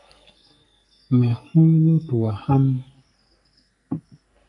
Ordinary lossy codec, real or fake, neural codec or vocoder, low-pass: AAC, 48 kbps; fake; codec, 44.1 kHz, 2.6 kbps, SNAC; 10.8 kHz